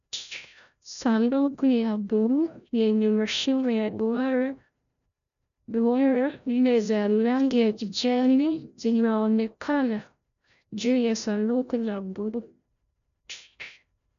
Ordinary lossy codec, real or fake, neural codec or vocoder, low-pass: MP3, 96 kbps; fake; codec, 16 kHz, 0.5 kbps, FreqCodec, larger model; 7.2 kHz